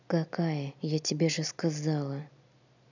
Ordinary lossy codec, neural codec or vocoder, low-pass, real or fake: none; none; 7.2 kHz; real